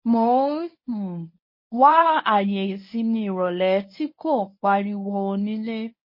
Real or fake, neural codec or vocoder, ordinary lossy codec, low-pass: fake; codec, 24 kHz, 0.9 kbps, WavTokenizer, medium speech release version 1; MP3, 24 kbps; 5.4 kHz